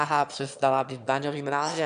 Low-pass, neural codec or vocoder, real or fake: 9.9 kHz; autoencoder, 22.05 kHz, a latent of 192 numbers a frame, VITS, trained on one speaker; fake